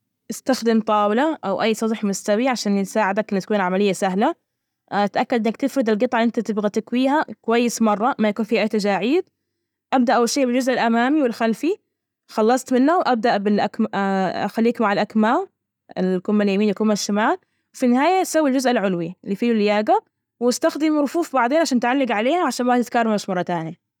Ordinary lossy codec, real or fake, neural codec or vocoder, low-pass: none; real; none; 19.8 kHz